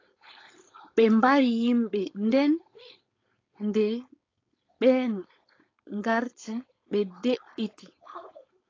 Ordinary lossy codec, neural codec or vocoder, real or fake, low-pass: AAC, 32 kbps; codec, 16 kHz, 4.8 kbps, FACodec; fake; 7.2 kHz